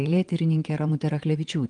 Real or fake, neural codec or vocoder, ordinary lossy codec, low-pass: fake; vocoder, 22.05 kHz, 80 mel bands, WaveNeXt; Opus, 24 kbps; 9.9 kHz